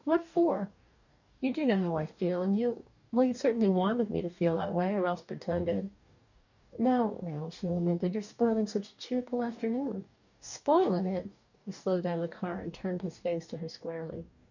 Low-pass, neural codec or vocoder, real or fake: 7.2 kHz; codec, 44.1 kHz, 2.6 kbps, DAC; fake